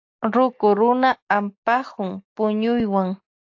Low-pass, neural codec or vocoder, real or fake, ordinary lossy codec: 7.2 kHz; none; real; AAC, 48 kbps